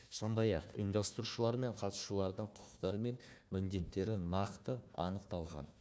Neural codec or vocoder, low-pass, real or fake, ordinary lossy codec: codec, 16 kHz, 1 kbps, FunCodec, trained on Chinese and English, 50 frames a second; none; fake; none